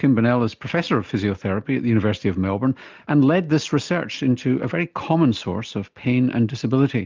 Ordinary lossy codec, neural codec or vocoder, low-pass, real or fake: Opus, 24 kbps; none; 7.2 kHz; real